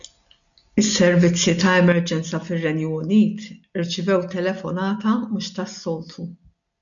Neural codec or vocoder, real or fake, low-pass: none; real; 7.2 kHz